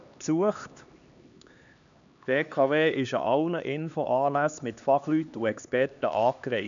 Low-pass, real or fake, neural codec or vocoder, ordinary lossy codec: 7.2 kHz; fake; codec, 16 kHz, 2 kbps, X-Codec, HuBERT features, trained on LibriSpeech; none